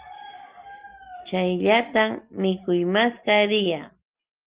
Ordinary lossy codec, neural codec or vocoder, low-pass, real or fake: Opus, 24 kbps; none; 3.6 kHz; real